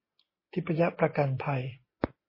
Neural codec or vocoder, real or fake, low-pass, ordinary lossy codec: none; real; 5.4 kHz; MP3, 24 kbps